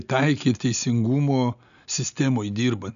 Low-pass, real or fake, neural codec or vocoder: 7.2 kHz; real; none